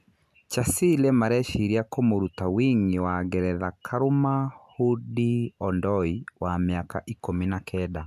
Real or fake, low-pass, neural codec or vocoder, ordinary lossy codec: real; 14.4 kHz; none; none